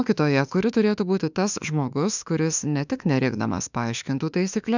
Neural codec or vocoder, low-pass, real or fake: autoencoder, 48 kHz, 32 numbers a frame, DAC-VAE, trained on Japanese speech; 7.2 kHz; fake